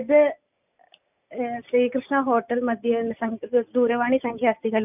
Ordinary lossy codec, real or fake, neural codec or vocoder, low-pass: none; real; none; 3.6 kHz